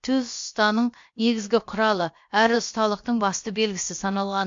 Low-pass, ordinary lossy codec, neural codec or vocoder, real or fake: 7.2 kHz; MP3, 48 kbps; codec, 16 kHz, about 1 kbps, DyCAST, with the encoder's durations; fake